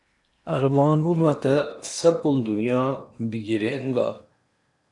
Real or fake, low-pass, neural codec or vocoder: fake; 10.8 kHz; codec, 16 kHz in and 24 kHz out, 0.8 kbps, FocalCodec, streaming, 65536 codes